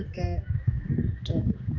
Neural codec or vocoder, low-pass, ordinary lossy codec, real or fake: codec, 16 kHz, 2 kbps, X-Codec, HuBERT features, trained on general audio; 7.2 kHz; none; fake